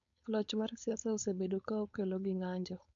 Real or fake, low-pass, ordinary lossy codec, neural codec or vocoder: fake; 7.2 kHz; none; codec, 16 kHz, 4.8 kbps, FACodec